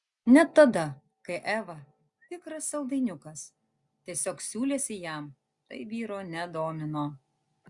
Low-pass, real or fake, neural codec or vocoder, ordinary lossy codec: 10.8 kHz; real; none; Opus, 64 kbps